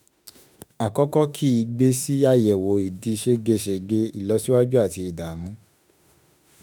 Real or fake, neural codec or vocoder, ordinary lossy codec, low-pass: fake; autoencoder, 48 kHz, 32 numbers a frame, DAC-VAE, trained on Japanese speech; none; none